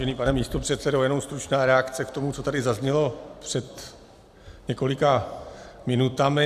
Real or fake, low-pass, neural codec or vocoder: real; 14.4 kHz; none